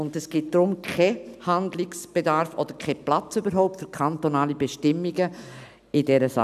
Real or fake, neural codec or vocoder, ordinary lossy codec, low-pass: real; none; none; 14.4 kHz